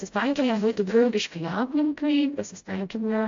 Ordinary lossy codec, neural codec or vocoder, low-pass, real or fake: AAC, 48 kbps; codec, 16 kHz, 0.5 kbps, FreqCodec, smaller model; 7.2 kHz; fake